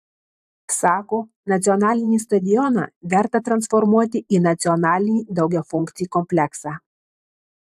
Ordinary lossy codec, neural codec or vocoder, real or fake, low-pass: AAC, 96 kbps; vocoder, 44.1 kHz, 128 mel bands every 512 samples, BigVGAN v2; fake; 14.4 kHz